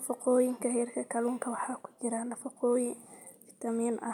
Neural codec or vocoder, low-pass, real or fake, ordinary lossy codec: none; 19.8 kHz; real; none